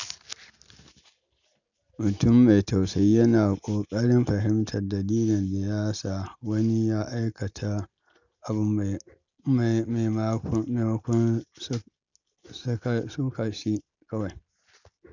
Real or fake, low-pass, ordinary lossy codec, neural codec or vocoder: real; 7.2 kHz; none; none